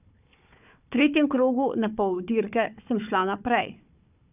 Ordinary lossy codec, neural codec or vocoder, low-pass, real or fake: none; codec, 16 kHz, 4 kbps, FunCodec, trained on Chinese and English, 50 frames a second; 3.6 kHz; fake